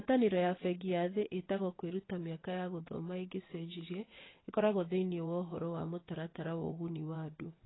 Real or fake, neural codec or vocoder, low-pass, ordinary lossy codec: fake; vocoder, 22.05 kHz, 80 mel bands, WaveNeXt; 7.2 kHz; AAC, 16 kbps